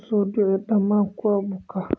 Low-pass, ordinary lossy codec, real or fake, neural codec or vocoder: none; none; real; none